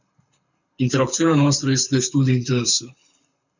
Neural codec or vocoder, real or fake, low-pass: codec, 24 kHz, 6 kbps, HILCodec; fake; 7.2 kHz